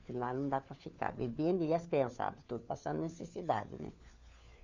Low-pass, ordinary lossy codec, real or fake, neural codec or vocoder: 7.2 kHz; none; fake; codec, 16 kHz, 4 kbps, FunCodec, trained on LibriTTS, 50 frames a second